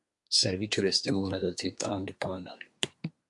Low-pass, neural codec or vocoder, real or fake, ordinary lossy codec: 10.8 kHz; codec, 24 kHz, 1 kbps, SNAC; fake; MP3, 64 kbps